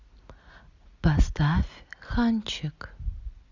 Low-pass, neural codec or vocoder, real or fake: 7.2 kHz; none; real